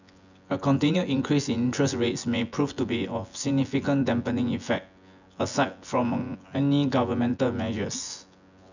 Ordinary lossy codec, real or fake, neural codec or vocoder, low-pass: none; fake; vocoder, 24 kHz, 100 mel bands, Vocos; 7.2 kHz